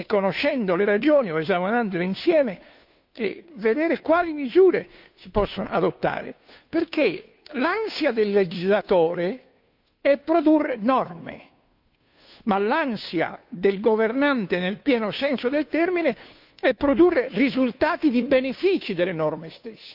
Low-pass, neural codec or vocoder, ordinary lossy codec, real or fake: 5.4 kHz; codec, 16 kHz, 2 kbps, FunCodec, trained on Chinese and English, 25 frames a second; none; fake